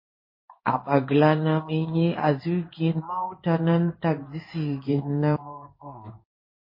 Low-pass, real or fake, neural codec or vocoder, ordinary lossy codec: 5.4 kHz; fake; codec, 16 kHz in and 24 kHz out, 1 kbps, XY-Tokenizer; MP3, 24 kbps